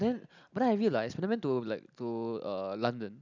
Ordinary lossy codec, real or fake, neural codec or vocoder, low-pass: none; real; none; 7.2 kHz